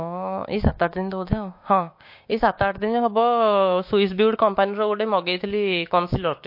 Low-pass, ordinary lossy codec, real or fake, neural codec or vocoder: 5.4 kHz; MP3, 32 kbps; fake; codec, 16 kHz, 6 kbps, DAC